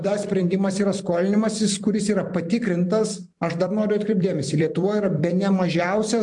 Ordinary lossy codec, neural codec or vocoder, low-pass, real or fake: MP3, 64 kbps; vocoder, 44.1 kHz, 128 mel bands every 256 samples, BigVGAN v2; 10.8 kHz; fake